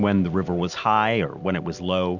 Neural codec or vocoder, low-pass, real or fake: none; 7.2 kHz; real